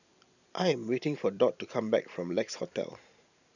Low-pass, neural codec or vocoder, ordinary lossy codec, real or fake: 7.2 kHz; vocoder, 44.1 kHz, 128 mel bands every 512 samples, BigVGAN v2; none; fake